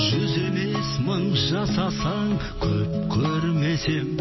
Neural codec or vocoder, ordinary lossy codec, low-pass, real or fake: none; MP3, 24 kbps; 7.2 kHz; real